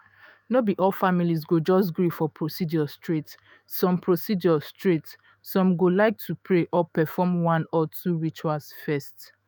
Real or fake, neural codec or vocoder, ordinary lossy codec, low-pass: fake; autoencoder, 48 kHz, 128 numbers a frame, DAC-VAE, trained on Japanese speech; none; none